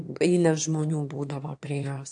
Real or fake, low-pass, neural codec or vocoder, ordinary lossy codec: fake; 9.9 kHz; autoencoder, 22.05 kHz, a latent of 192 numbers a frame, VITS, trained on one speaker; AAC, 64 kbps